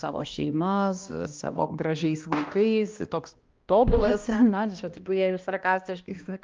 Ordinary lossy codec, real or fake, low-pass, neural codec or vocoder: Opus, 24 kbps; fake; 7.2 kHz; codec, 16 kHz, 1 kbps, X-Codec, HuBERT features, trained on balanced general audio